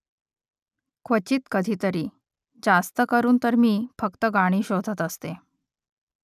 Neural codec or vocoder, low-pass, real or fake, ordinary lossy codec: none; 14.4 kHz; real; none